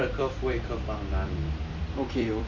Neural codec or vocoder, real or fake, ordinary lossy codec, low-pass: vocoder, 44.1 kHz, 128 mel bands every 256 samples, BigVGAN v2; fake; none; 7.2 kHz